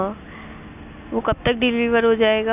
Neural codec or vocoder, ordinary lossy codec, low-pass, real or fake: none; none; 3.6 kHz; real